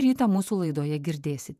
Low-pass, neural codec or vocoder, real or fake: 14.4 kHz; vocoder, 48 kHz, 128 mel bands, Vocos; fake